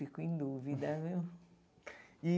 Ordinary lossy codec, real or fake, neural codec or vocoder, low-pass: none; real; none; none